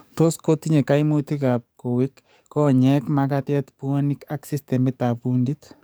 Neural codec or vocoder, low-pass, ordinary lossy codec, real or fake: codec, 44.1 kHz, 7.8 kbps, DAC; none; none; fake